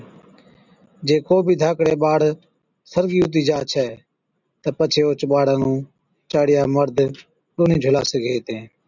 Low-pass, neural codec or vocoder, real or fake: 7.2 kHz; none; real